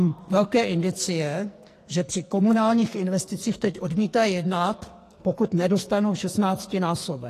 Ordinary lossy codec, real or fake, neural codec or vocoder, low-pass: AAC, 48 kbps; fake; codec, 44.1 kHz, 2.6 kbps, SNAC; 14.4 kHz